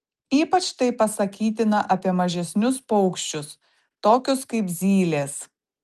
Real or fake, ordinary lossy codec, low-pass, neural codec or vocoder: real; Opus, 24 kbps; 14.4 kHz; none